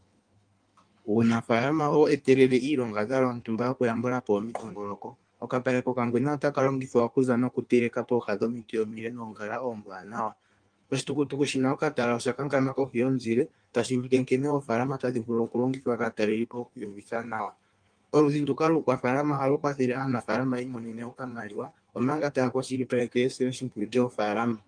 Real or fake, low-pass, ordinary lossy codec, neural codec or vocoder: fake; 9.9 kHz; Opus, 32 kbps; codec, 16 kHz in and 24 kHz out, 1.1 kbps, FireRedTTS-2 codec